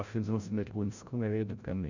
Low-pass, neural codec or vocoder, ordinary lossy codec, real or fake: 7.2 kHz; codec, 16 kHz, 0.5 kbps, FreqCodec, larger model; Opus, 64 kbps; fake